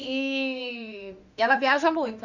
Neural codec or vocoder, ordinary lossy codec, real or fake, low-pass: autoencoder, 48 kHz, 32 numbers a frame, DAC-VAE, trained on Japanese speech; none; fake; 7.2 kHz